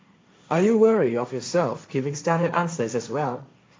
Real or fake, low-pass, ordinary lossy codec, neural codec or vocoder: fake; none; none; codec, 16 kHz, 1.1 kbps, Voila-Tokenizer